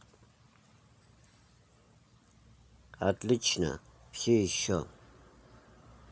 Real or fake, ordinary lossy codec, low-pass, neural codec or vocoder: real; none; none; none